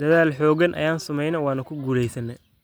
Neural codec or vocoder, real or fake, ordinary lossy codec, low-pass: none; real; none; none